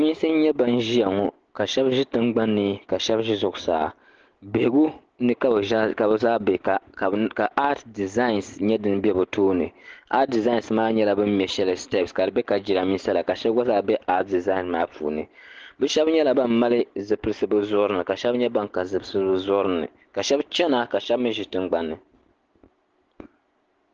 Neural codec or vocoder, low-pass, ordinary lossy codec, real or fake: none; 7.2 kHz; Opus, 16 kbps; real